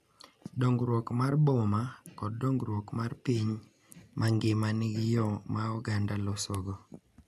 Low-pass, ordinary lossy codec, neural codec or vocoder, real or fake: 14.4 kHz; none; none; real